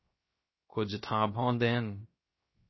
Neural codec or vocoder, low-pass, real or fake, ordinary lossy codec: codec, 16 kHz, 0.3 kbps, FocalCodec; 7.2 kHz; fake; MP3, 24 kbps